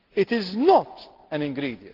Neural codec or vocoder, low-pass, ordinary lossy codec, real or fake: none; 5.4 kHz; Opus, 16 kbps; real